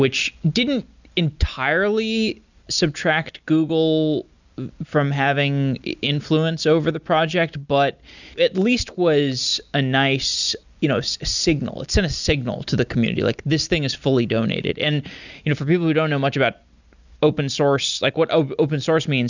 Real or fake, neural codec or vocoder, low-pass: real; none; 7.2 kHz